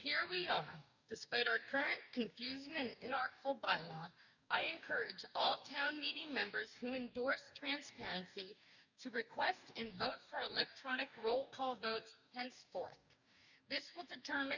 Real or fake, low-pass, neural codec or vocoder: fake; 7.2 kHz; codec, 44.1 kHz, 2.6 kbps, DAC